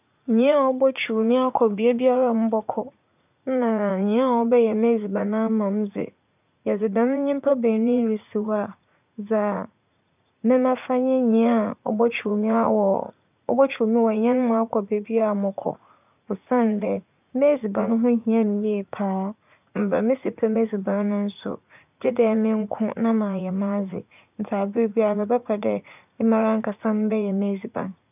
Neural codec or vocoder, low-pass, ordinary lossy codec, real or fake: vocoder, 44.1 kHz, 80 mel bands, Vocos; 3.6 kHz; none; fake